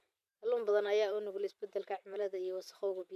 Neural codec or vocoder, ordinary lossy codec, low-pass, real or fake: vocoder, 44.1 kHz, 128 mel bands every 512 samples, BigVGAN v2; none; 14.4 kHz; fake